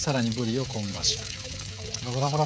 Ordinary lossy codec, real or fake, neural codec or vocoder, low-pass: none; fake; codec, 16 kHz, 16 kbps, FreqCodec, smaller model; none